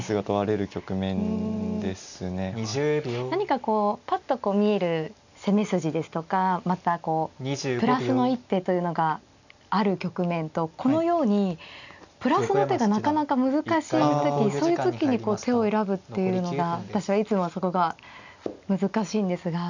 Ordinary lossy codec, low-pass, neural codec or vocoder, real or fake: none; 7.2 kHz; none; real